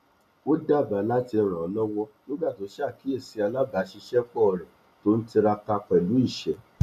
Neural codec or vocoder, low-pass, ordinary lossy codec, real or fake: none; 14.4 kHz; none; real